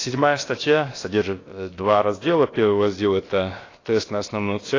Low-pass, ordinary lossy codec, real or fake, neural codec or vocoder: 7.2 kHz; AAC, 32 kbps; fake; codec, 16 kHz, about 1 kbps, DyCAST, with the encoder's durations